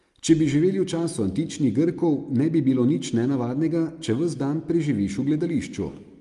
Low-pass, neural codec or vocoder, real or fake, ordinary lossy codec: 10.8 kHz; none; real; Opus, 32 kbps